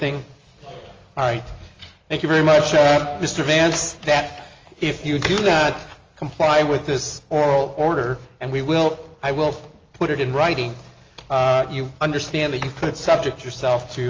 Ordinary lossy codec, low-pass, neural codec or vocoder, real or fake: Opus, 32 kbps; 7.2 kHz; none; real